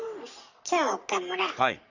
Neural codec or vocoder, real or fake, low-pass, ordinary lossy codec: codec, 44.1 kHz, 3.4 kbps, Pupu-Codec; fake; 7.2 kHz; none